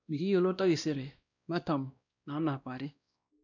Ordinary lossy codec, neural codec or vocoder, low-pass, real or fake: none; codec, 16 kHz, 1 kbps, X-Codec, WavLM features, trained on Multilingual LibriSpeech; 7.2 kHz; fake